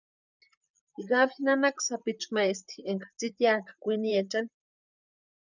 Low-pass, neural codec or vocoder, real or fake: 7.2 kHz; vocoder, 44.1 kHz, 128 mel bands, Pupu-Vocoder; fake